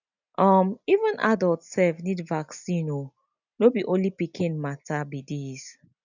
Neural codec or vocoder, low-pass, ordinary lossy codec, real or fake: none; 7.2 kHz; none; real